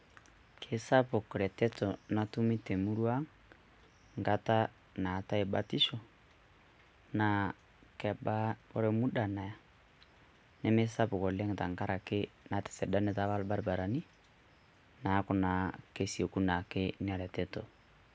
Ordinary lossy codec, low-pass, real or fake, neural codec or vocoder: none; none; real; none